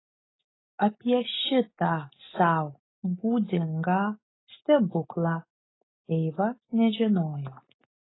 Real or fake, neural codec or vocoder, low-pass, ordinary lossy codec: real; none; 7.2 kHz; AAC, 16 kbps